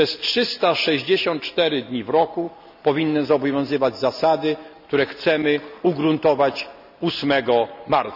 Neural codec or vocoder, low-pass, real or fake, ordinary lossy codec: none; 5.4 kHz; real; none